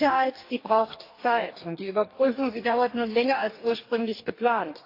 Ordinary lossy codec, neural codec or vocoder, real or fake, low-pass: AAC, 32 kbps; codec, 44.1 kHz, 2.6 kbps, DAC; fake; 5.4 kHz